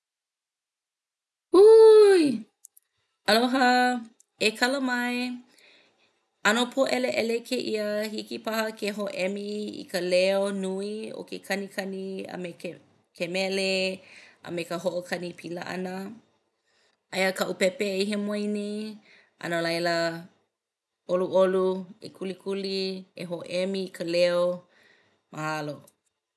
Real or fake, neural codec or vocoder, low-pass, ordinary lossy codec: real; none; none; none